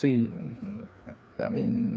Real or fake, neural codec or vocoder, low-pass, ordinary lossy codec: fake; codec, 16 kHz, 2 kbps, FreqCodec, larger model; none; none